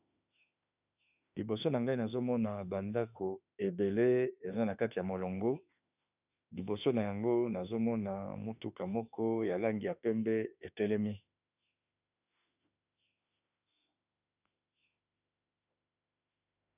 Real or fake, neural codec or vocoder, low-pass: fake; autoencoder, 48 kHz, 32 numbers a frame, DAC-VAE, trained on Japanese speech; 3.6 kHz